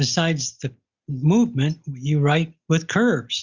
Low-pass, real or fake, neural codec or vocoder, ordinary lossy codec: 7.2 kHz; real; none; Opus, 64 kbps